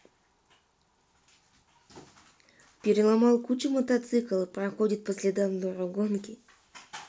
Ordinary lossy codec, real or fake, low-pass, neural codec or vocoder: none; real; none; none